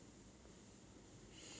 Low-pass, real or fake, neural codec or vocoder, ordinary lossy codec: none; real; none; none